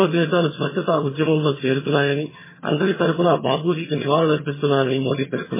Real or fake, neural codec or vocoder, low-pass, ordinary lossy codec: fake; vocoder, 22.05 kHz, 80 mel bands, HiFi-GAN; 3.6 kHz; MP3, 16 kbps